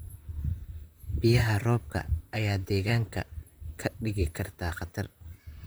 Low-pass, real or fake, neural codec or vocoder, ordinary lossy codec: none; fake; vocoder, 44.1 kHz, 128 mel bands, Pupu-Vocoder; none